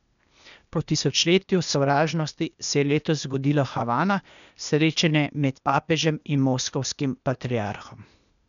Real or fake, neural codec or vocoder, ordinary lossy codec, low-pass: fake; codec, 16 kHz, 0.8 kbps, ZipCodec; none; 7.2 kHz